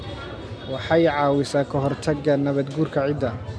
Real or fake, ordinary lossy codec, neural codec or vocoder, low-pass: real; none; none; none